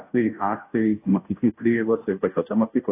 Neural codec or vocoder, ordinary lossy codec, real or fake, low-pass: codec, 16 kHz, 0.5 kbps, FunCodec, trained on Chinese and English, 25 frames a second; AAC, 24 kbps; fake; 3.6 kHz